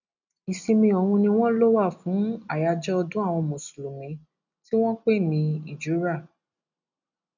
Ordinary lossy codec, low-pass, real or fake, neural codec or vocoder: none; 7.2 kHz; real; none